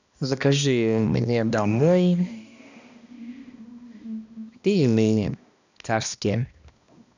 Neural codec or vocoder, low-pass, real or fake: codec, 16 kHz, 1 kbps, X-Codec, HuBERT features, trained on balanced general audio; 7.2 kHz; fake